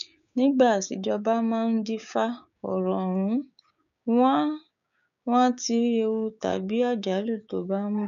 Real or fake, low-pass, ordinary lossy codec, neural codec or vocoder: fake; 7.2 kHz; none; codec, 16 kHz, 6 kbps, DAC